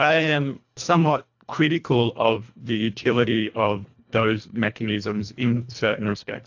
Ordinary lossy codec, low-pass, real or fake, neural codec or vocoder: AAC, 48 kbps; 7.2 kHz; fake; codec, 24 kHz, 1.5 kbps, HILCodec